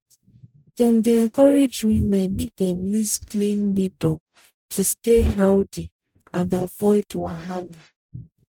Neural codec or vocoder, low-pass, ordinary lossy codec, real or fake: codec, 44.1 kHz, 0.9 kbps, DAC; 19.8 kHz; none; fake